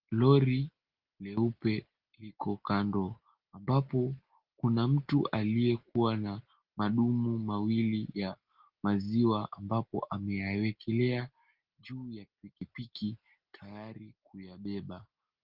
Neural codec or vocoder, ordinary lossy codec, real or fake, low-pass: none; Opus, 16 kbps; real; 5.4 kHz